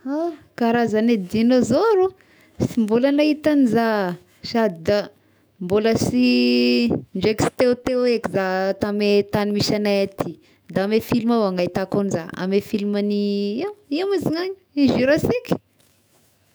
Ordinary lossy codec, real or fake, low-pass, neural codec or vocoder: none; fake; none; autoencoder, 48 kHz, 128 numbers a frame, DAC-VAE, trained on Japanese speech